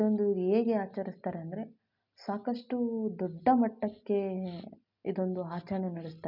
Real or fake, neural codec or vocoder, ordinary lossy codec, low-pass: real; none; none; 5.4 kHz